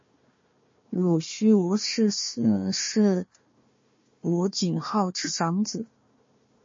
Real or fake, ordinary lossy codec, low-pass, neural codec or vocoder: fake; MP3, 32 kbps; 7.2 kHz; codec, 16 kHz, 1 kbps, FunCodec, trained on Chinese and English, 50 frames a second